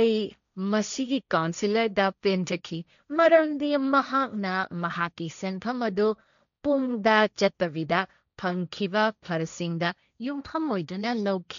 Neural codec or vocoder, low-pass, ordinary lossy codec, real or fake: codec, 16 kHz, 1.1 kbps, Voila-Tokenizer; 7.2 kHz; none; fake